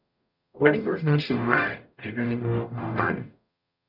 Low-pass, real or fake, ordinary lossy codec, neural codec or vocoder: 5.4 kHz; fake; none; codec, 44.1 kHz, 0.9 kbps, DAC